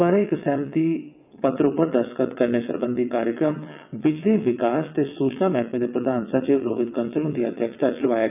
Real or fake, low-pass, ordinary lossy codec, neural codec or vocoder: fake; 3.6 kHz; none; vocoder, 22.05 kHz, 80 mel bands, WaveNeXt